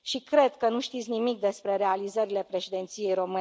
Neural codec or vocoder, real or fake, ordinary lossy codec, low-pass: none; real; none; none